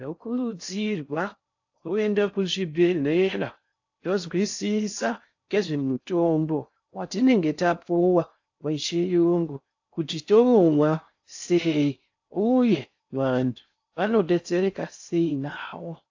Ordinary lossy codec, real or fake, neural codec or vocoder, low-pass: AAC, 48 kbps; fake; codec, 16 kHz in and 24 kHz out, 0.6 kbps, FocalCodec, streaming, 4096 codes; 7.2 kHz